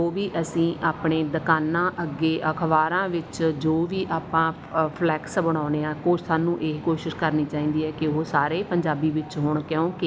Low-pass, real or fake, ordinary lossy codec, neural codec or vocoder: none; real; none; none